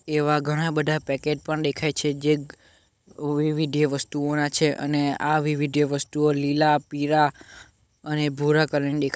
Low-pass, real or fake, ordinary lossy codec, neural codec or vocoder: none; fake; none; codec, 16 kHz, 16 kbps, FunCodec, trained on Chinese and English, 50 frames a second